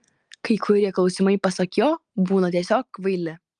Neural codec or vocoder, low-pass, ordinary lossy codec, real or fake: none; 9.9 kHz; Opus, 32 kbps; real